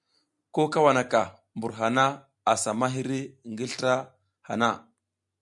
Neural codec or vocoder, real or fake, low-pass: none; real; 10.8 kHz